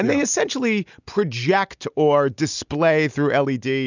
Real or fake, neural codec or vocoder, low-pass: real; none; 7.2 kHz